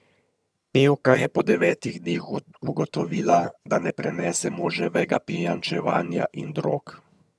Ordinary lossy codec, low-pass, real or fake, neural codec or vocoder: none; none; fake; vocoder, 22.05 kHz, 80 mel bands, HiFi-GAN